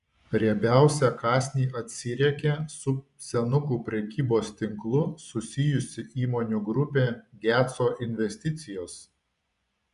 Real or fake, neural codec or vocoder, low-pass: fake; vocoder, 24 kHz, 100 mel bands, Vocos; 10.8 kHz